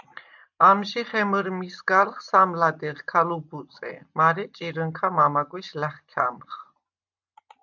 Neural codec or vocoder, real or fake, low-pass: none; real; 7.2 kHz